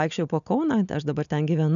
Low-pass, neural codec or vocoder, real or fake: 7.2 kHz; none; real